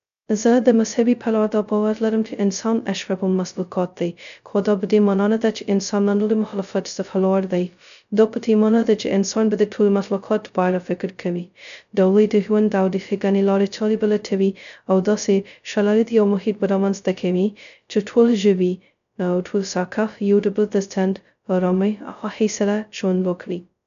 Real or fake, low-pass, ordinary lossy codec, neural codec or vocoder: fake; 7.2 kHz; none; codec, 16 kHz, 0.2 kbps, FocalCodec